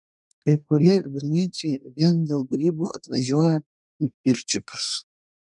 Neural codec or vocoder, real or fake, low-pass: codec, 24 kHz, 1 kbps, SNAC; fake; 10.8 kHz